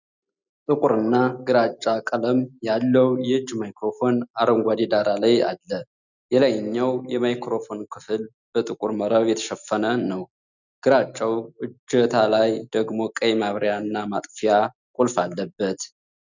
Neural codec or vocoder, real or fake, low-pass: none; real; 7.2 kHz